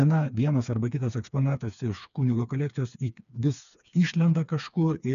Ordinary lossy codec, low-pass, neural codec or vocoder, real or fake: MP3, 96 kbps; 7.2 kHz; codec, 16 kHz, 4 kbps, FreqCodec, smaller model; fake